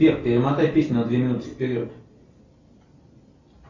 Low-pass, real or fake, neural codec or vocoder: 7.2 kHz; real; none